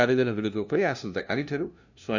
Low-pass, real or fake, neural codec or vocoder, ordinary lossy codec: 7.2 kHz; fake; codec, 16 kHz, 0.5 kbps, FunCodec, trained on LibriTTS, 25 frames a second; none